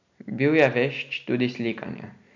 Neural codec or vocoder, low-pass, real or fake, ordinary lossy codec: none; 7.2 kHz; real; MP3, 64 kbps